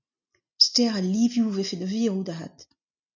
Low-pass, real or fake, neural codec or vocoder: 7.2 kHz; real; none